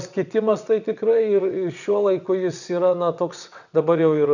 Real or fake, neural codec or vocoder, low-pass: fake; vocoder, 22.05 kHz, 80 mel bands, WaveNeXt; 7.2 kHz